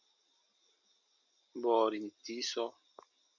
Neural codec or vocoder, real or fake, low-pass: none; real; 7.2 kHz